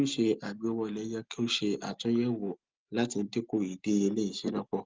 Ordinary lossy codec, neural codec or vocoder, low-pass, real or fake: Opus, 32 kbps; none; 7.2 kHz; real